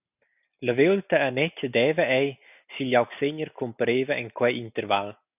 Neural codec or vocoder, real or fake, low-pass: none; real; 3.6 kHz